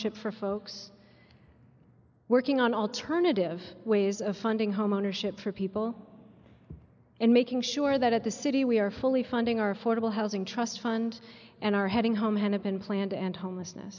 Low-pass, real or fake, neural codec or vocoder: 7.2 kHz; real; none